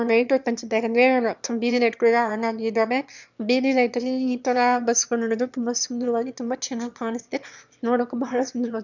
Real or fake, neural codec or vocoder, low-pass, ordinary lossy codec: fake; autoencoder, 22.05 kHz, a latent of 192 numbers a frame, VITS, trained on one speaker; 7.2 kHz; none